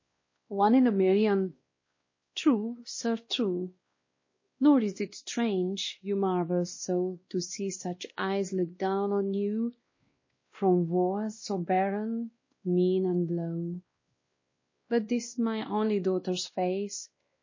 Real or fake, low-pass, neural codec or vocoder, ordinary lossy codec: fake; 7.2 kHz; codec, 16 kHz, 1 kbps, X-Codec, WavLM features, trained on Multilingual LibriSpeech; MP3, 32 kbps